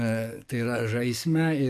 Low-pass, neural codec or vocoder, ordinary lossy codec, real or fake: 14.4 kHz; codec, 44.1 kHz, 7.8 kbps, DAC; MP3, 64 kbps; fake